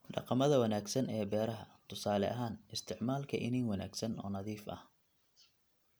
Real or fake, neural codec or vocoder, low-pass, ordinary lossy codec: real; none; none; none